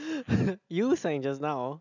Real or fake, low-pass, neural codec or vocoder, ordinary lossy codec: real; 7.2 kHz; none; none